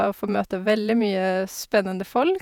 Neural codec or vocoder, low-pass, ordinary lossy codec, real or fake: vocoder, 48 kHz, 128 mel bands, Vocos; 19.8 kHz; none; fake